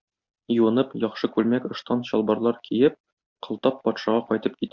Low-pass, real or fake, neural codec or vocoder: 7.2 kHz; real; none